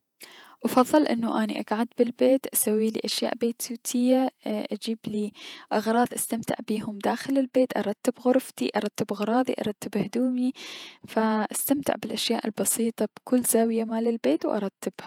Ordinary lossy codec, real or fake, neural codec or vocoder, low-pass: none; fake; vocoder, 48 kHz, 128 mel bands, Vocos; 19.8 kHz